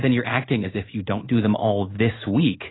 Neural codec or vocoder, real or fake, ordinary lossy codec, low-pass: codec, 16 kHz in and 24 kHz out, 1 kbps, XY-Tokenizer; fake; AAC, 16 kbps; 7.2 kHz